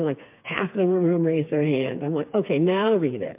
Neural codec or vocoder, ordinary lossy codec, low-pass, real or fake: codec, 16 kHz, 4 kbps, FreqCodec, smaller model; MP3, 32 kbps; 3.6 kHz; fake